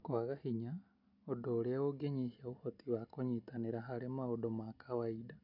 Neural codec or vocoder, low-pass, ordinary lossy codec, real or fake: none; 5.4 kHz; none; real